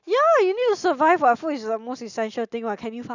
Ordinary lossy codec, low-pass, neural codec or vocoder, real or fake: none; 7.2 kHz; none; real